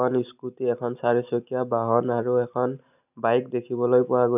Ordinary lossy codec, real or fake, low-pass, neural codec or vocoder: none; real; 3.6 kHz; none